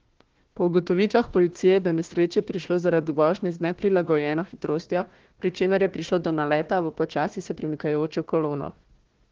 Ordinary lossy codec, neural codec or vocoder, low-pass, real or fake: Opus, 16 kbps; codec, 16 kHz, 1 kbps, FunCodec, trained on Chinese and English, 50 frames a second; 7.2 kHz; fake